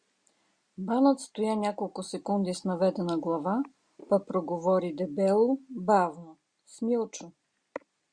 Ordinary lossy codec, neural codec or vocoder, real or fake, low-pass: Opus, 64 kbps; none; real; 9.9 kHz